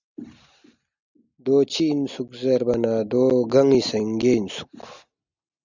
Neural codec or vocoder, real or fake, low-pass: none; real; 7.2 kHz